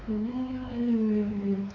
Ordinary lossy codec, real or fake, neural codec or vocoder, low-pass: none; fake; codec, 16 kHz, 4 kbps, X-Codec, WavLM features, trained on Multilingual LibriSpeech; 7.2 kHz